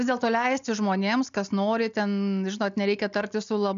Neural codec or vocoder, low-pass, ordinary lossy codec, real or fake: none; 7.2 kHz; AAC, 96 kbps; real